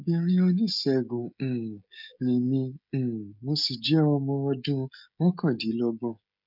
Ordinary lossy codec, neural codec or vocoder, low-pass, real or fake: none; codec, 16 kHz, 16 kbps, FreqCodec, smaller model; 5.4 kHz; fake